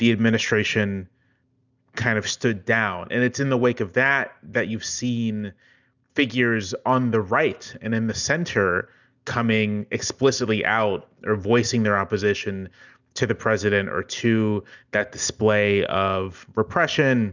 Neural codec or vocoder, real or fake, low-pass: none; real; 7.2 kHz